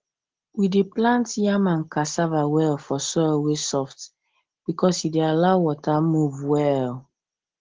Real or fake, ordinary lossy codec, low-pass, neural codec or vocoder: real; Opus, 16 kbps; 7.2 kHz; none